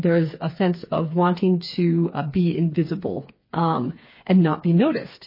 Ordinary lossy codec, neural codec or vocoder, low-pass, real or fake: MP3, 24 kbps; codec, 16 kHz, 4 kbps, FreqCodec, smaller model; 5.4 kHz; fake